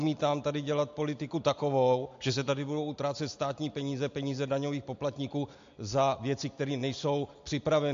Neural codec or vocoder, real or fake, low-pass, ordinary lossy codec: none; real; 7.2 kHz; MP3, 48 kbps